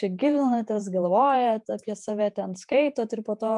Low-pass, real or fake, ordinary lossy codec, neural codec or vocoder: 10.8 kHz; fake; MP3, 96 kbps; vocoder, 48 kHz, 128 mel bands, Vocos